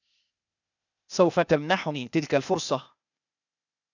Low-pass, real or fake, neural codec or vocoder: 7.2 kHz; fake; codec, 16 kHz, 0.8 kbps, ZipCodec